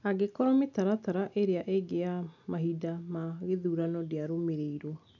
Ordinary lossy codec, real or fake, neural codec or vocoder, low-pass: none; real; none; 7.2 kHz